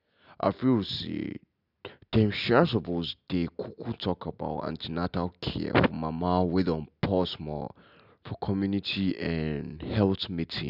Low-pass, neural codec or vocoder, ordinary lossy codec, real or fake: 5.4 kHz; none; none; real